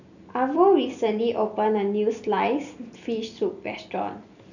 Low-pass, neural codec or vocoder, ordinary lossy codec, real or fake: 7.2 kHz; none; none; real